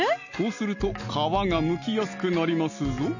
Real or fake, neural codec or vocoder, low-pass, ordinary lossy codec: real; none; 7.2 kHz; none